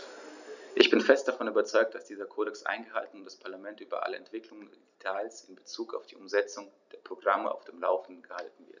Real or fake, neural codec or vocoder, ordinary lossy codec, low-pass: real; none; none; 7.2 kHz